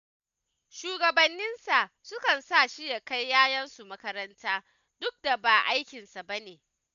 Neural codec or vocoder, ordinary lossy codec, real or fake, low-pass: none; none; real; 7.2 kHz